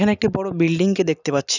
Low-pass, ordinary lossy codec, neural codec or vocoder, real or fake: 7.2 kHz; none; none; real